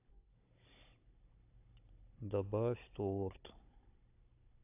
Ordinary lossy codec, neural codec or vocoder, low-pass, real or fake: AAC, 32 kbps; codec, 16 kHz, 16 kbps, FunCodec, trained on Chinese and English, 50 frames a second; 3.6 kHz; fake